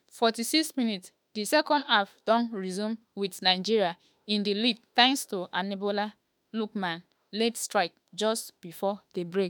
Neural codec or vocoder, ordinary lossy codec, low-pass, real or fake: autoencoder, 48 kHz, 32 numbers a frame, DAC-VAE, trained on Japanese speech; none; none; fake